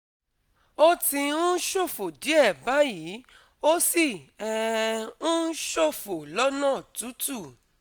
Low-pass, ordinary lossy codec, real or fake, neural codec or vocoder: none; none; real; none